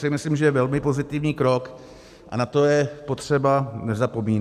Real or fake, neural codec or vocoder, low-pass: real; none; 14.4 kHz